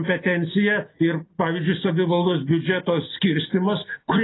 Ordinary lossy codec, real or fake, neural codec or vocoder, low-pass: AAC, 16 kbps; real; none; 7.2 kHz